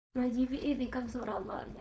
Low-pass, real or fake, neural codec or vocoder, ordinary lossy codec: none; fake; codec, 16 kHz, 4.8 kbps, FACodec; none